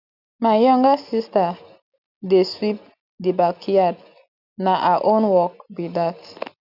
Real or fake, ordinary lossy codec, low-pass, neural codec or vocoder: real; none; 5.4 kHz; none